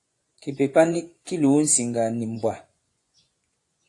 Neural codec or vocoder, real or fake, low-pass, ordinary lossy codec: vocoder, 24 kHz, 100 mel bands, Vocos; fake; 10.8 kHz; AAC, 48 kbps